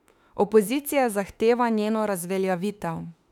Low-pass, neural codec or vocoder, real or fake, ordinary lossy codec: 19.8 kHz; autoencoder, 48 kHz, 32 numbers a frame, DAC-VAE, trained on Japanese speech; fake; none